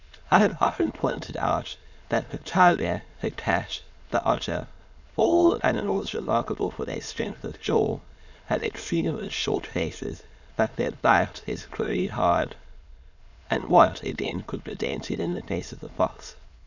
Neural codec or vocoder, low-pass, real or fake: autoencoder, 22.05 kHz, a latent of 192 numbers a frame, VITS, trained on many speakers; 7.2 kHz; fake